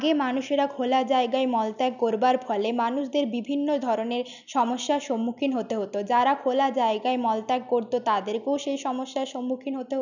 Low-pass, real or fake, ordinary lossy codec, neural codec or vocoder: 7.2 kHz; real; none; none